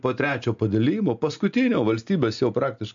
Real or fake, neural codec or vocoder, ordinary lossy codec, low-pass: real; none; AAC, 64 kbps; 7.2 kHz